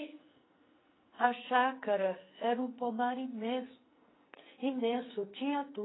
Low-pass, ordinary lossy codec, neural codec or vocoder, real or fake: 7.2 kHz; AAC, 16 kbps; codec, 44.1 kHz, 2.6 kbps, SNAC; fake